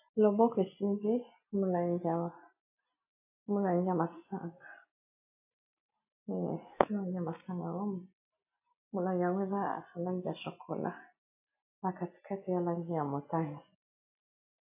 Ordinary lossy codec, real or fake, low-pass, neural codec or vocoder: AAC, 32 kbps; real; 3.6 kHz; none